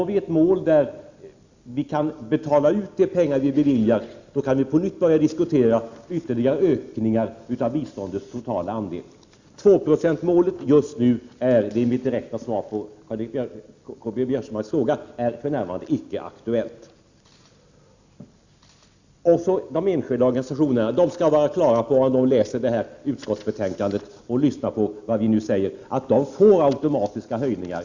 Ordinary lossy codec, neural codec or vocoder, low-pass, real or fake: Opus, 64 kbps; none; 7.2 kHz; real